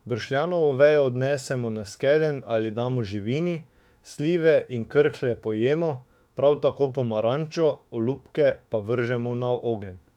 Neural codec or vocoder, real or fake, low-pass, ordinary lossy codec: autoencoder, 48 kHz, 32 numbers a frame, DAC-VAE, trained on Japanese speech; fake; 19.8 kHz; none